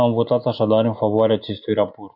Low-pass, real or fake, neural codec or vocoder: 5.4 kHz; real; none